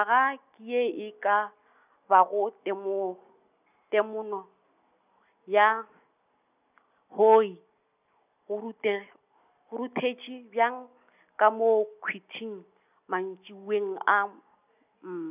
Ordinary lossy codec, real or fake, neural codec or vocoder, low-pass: none; real; none; 3.6 kHz